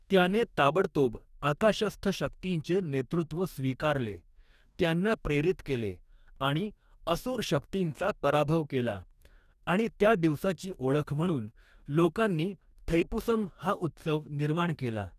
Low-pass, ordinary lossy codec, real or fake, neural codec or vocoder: 14.4 kHz; none; fake; codec, 44.1 kHz, 2.6 kbps, DAC